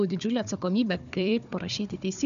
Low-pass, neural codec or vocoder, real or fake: 7.2 kHz; codec, 16 kHz, 4 kbps, FunCodec, trained on Chinese and English, 50 frames a second; fake